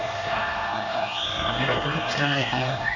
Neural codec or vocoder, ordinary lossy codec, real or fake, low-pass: codec, 24 kHz, 1 kbps, SNAC; none; fake; 7.2 kHz